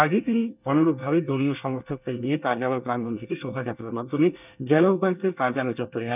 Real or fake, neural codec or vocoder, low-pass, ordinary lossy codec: fake; codec, 24 kHz, 1 kbps, SNAC; 3.6 kHz; none